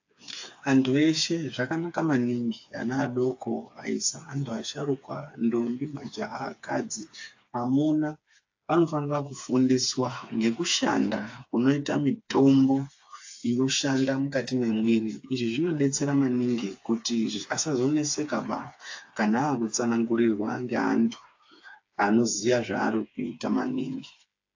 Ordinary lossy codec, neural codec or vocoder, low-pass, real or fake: AAC, 48 kbps; codec, 16 kHz, 4 kbps, FreqCodec, smaller model; 7.2 kHz; fake